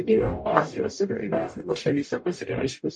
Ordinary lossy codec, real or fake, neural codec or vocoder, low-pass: MP3, 48 kbps; fake; codec, 44.1 kHz, 0.9 kbps, DAC; 9.9 kHz